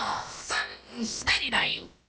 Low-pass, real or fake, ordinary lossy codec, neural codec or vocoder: none; fake; none; codec, 16 kHz, about 1 kbps, DyCAST, with the encoder's durations